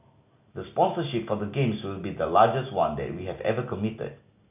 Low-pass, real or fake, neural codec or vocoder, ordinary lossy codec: 3.6 kHz; real; none; none